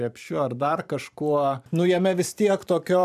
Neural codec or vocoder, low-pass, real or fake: vocoder, 44.1 kHz, 128 mel bands every 512 samples, BigVGAN v2; 14.4 kHz; fake